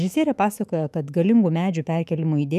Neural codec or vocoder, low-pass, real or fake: autoencoder, 48 kHz, 128 numbers a frame, DAC-VAE, trained on Japanese speech; 14.4 kHz; fake